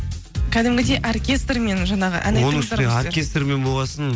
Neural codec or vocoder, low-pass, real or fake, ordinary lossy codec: none; none; real; none